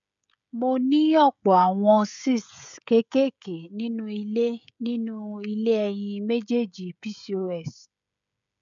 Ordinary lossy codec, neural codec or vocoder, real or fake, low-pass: none; codec, 16 kHz, 16 kbps, FreqCodec, smaller model; fake; 7.2 kHz